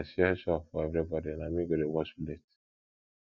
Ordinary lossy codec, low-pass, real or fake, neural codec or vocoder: none; 7.2 kHz; real; none